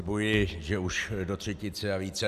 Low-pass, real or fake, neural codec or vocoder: 14.4 kHz; real; none